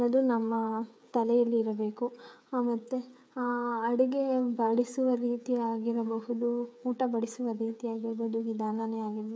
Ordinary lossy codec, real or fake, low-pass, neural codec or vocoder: none; fake; none; codec, 16 kHz, 16 kbps, FreqCodec, smaller model